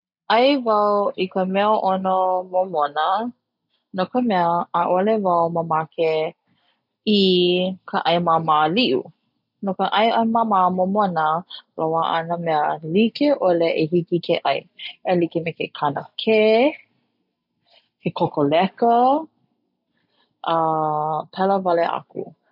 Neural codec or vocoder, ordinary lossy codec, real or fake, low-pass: none; none; real; 5.4 kHz